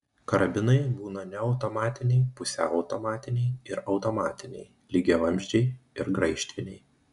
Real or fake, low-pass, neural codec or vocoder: real; 10.8 kHz; none